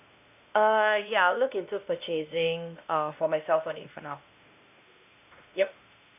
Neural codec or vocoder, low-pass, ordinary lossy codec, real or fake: codec, 16 kHz, 1 kbps, X-Codec, WavLM features, trained on Multilingual LibriSpeech; 3.6 kHz; none; fake